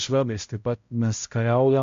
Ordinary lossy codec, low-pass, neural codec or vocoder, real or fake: MP3, 48 kbps; 7.2 kHz; codec, 16 kHz, 0.5 kbps, X-Codec, HuBERT features, trained on balanced general audio; fake